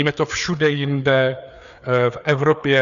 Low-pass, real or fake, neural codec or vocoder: 7.2 kHz; fake; codec, 16 kHz, 4 kbps, FreqCodec, larger model